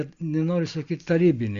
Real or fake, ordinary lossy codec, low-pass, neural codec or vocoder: real; Opus, 64 kbps; 7.2 kHz; none